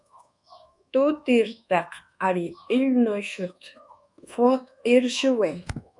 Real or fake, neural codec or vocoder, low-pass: fake; codec, 24 kHz, 1.2 kbps, DualCodec; 10.8 kHz